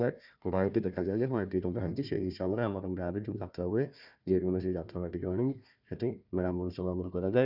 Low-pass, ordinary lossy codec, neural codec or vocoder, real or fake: 5.4 kHz; none; codec, 16 kHz, 1 kbps, FunCodec, trained on Chinese and English, 50 frames a second; fake